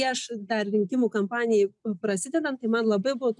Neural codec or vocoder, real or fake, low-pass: none; real; 10.8 kHz